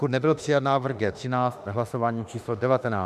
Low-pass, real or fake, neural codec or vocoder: 14.4 kHz; fake; autoencoder, 48 kHz, 32 numbers a frame, DAC-VAE, trained on Japanese speech